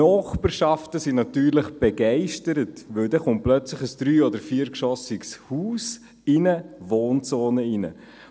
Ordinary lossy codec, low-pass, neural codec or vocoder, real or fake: none; none; none; real